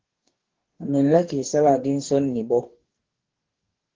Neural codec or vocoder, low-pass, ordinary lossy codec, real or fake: codec, 44.1 kHz, 2.6 kbps, DAC; 7.2 kHz; Opus, 16 kbps; fake